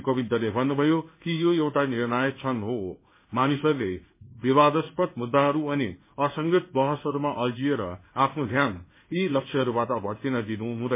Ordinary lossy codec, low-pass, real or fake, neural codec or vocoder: MP3, 24 kbps; 3.6 kHz; fake; codec, 16 kHz in and 24 kHz out, 1 kbps, XY-Tokenizer